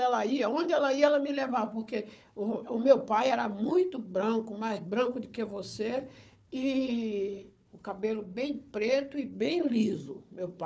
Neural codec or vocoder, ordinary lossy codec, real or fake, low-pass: codec, 16 kHz, 4 kbps, FunCodec, trained on Chinese and English, 50 frames a second; none; fake; none